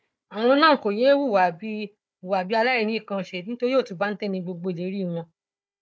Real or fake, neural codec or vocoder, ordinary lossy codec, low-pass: fake; codec, 16 kHz, 4 kbps, FunCodec, trained on Chinese and English, 50 frames a second; none; none